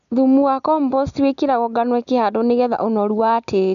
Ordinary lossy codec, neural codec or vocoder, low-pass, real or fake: none; none; 7.2 kHz; real